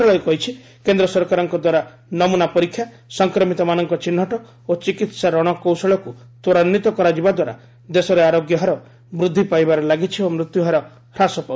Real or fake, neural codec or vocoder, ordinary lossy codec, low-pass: real; none; none; none